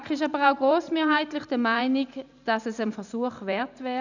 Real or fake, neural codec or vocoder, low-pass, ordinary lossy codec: fake; vocoder, 44.1 kHz, 128 mel bands every 512 samples, BigVGAN v2; 7.2 kHz; none